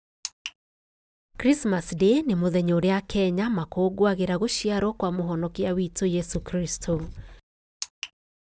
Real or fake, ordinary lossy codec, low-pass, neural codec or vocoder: real; none; none; none